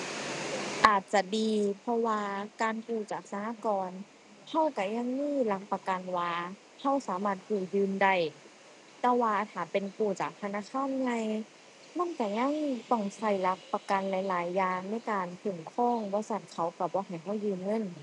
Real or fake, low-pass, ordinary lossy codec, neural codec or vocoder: real; 10.8 kHz; none; none